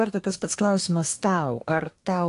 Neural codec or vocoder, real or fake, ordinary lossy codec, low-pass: codec, 24 kHz, 1 kbps, SNAC; fake; AAC, 48 kbps; 10.8 kHz